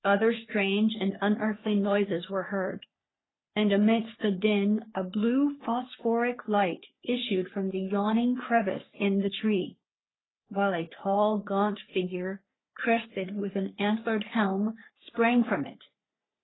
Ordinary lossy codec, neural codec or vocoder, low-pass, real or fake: AAC, 16 kbps; codec, 44.1 kHz, 3.4 kbps, Pupu-Codec; 7.2 kHz; fake